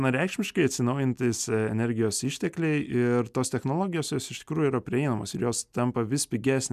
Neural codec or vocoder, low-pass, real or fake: none; 14.4 kHz; real